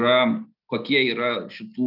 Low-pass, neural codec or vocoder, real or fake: 5.4 kHz; none; real